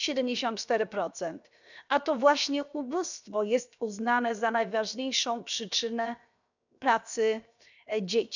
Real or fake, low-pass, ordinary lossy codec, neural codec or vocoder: fake; 7.2 kHz; none; codec, 16 kHz, 0.7 kbps, FocalCodec